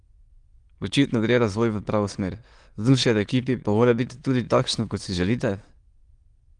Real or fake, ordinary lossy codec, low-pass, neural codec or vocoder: fake; Opus, 24 kbps; 9.9 kHz; autoencoder, 22.05 kHz, a latent of 192 numbers a frame, VITS, trained on many speakers